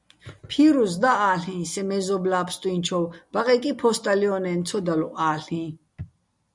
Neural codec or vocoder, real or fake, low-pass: none; real; 10.8 kHz